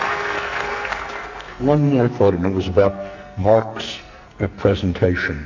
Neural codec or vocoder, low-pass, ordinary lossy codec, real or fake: codec, 44.1 kHz, 2.6 kbps, SNAC; 7.2 kHz; AAC, 48 kbps; fake